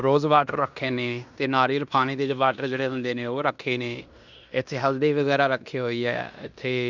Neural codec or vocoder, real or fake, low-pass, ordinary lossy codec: codec, 16 kHz in and 24 kHz out, 0.9 kbps, LongCat-Audio-Codec, fine tuned four codebook decoder; fake; 7.2 kHz; none